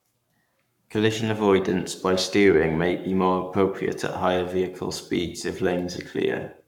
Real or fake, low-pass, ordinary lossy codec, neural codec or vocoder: fake; 19.8 kHz; none; codec, 44.1 kHz, 7.8 kbps, Pupu-Codec